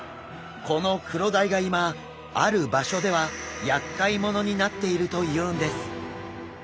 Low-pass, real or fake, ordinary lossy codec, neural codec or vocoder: none; real; none; none